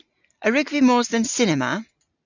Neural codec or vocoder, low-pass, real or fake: none; 7.2 kHz; real